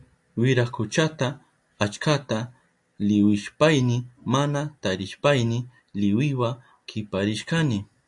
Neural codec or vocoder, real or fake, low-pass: none; real; 10.8 kHz